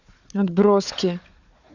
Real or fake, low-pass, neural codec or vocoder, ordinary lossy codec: fake; 7.2 kHz; codec, 16 kHz, 4 kbps, FunCodec, trained on Chinese and English, 50 frames a second; none